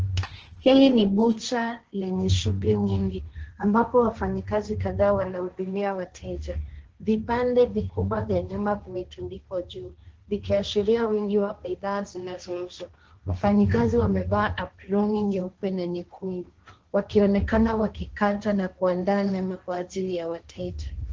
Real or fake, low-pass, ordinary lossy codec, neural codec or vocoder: fake; 7.2 kHz; Opus, 16 kbps; codec, 16 kHz, 1.1 kbps, Voila-Tokenizer